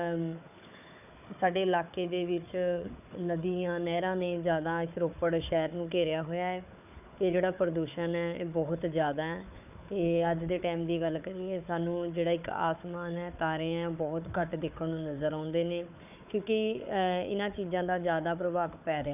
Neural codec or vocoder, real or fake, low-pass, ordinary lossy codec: codec, 16 kHz, 4 kbps, FunCodec, trained on Chinese and English, 50 frames a second; fake; 3.6 kHz; none